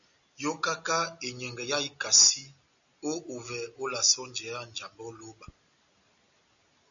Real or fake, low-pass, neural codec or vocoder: real; 7.2 kHz; none